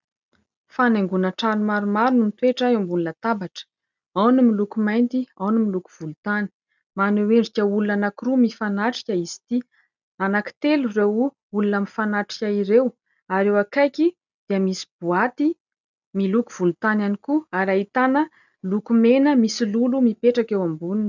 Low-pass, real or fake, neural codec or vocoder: 7.2 kHz; real; none